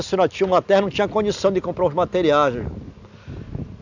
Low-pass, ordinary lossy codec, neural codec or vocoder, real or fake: 7.2 kHz; none; none; real